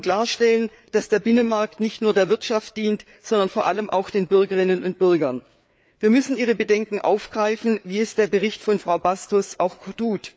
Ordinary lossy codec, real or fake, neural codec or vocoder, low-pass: none; fake; codec, 16 kHz, 4 kbps, FreqCodec, larger model; none